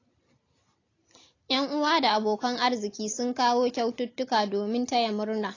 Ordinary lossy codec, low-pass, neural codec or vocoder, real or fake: AAC, 32 kbps; 7.2 kHz; none; real